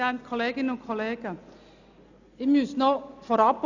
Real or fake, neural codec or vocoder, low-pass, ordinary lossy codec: real; none; 7.2 kHz; none